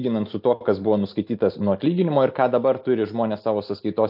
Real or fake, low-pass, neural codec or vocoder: real; 5.4 kHz; none